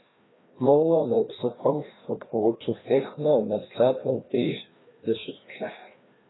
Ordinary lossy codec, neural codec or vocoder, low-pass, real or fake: AAC, 16 kbps; codec, 16 kHz, 1 kbps, FreqCodec, larger model; 7.2 kHz; fake